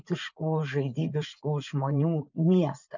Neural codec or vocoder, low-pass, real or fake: vocoder, 44.1 kHz, 80 mel bands, Vocos; 7.2 kHz; fake